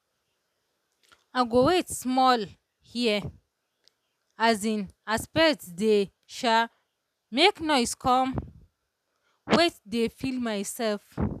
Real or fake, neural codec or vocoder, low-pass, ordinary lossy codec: real; none; 14.4 kHz; none